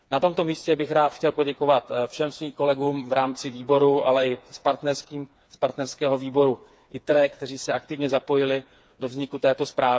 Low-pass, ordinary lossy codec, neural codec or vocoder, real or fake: none; none; codec, 16 kHz, 4 kbps, FreqCodec, smaller model; fake